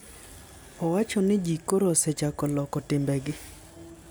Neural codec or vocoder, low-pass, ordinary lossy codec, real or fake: none; none; none; real